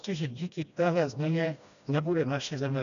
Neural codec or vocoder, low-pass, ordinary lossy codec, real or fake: codec, 16 kHz, 1 kbps, FreqCodec, smaller model; 7.2 kHz; MP3, 96 kbps; fake